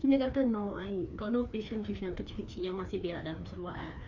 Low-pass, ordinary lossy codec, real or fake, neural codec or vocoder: 7.2 kHz; none; fake; codec, 16 kHz, 2 kbps, FreqCodec, larger model